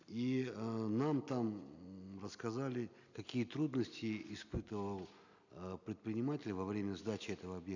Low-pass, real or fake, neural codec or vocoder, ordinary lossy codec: 7.2 kHz; real; none; none